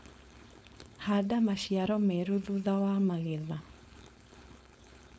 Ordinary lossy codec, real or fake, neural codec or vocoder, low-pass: none; fake; codec, 16 kHz, 4.8 kbps, FACodec; none